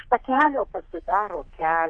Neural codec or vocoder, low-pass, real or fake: codec, 44.1 kHz, 3.4 kbps, Pupu-Codec; 9.9 kHz; fake